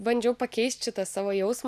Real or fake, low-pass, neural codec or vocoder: real; 14.4 kHz; none